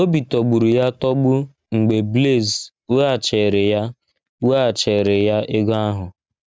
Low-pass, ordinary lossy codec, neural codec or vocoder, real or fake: none; none; none; real